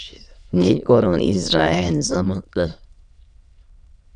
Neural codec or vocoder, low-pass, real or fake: autoencoder, 22.05 kHz, a latent of 192 numbers a frame, VITS, trained on many speakers; 9.9 kHz; fake